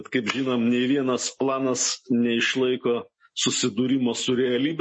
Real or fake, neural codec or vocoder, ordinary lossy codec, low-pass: real; none; MP3, 32 kbps; 9.9 kHz